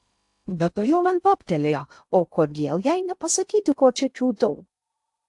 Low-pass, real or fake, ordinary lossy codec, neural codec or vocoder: 10.8 kHz; fake; AAC, 64 kbps; codec, 16 kHz in and 24 kHz out, 0.6 kbps, FocalCodec, streaming, 2048 codes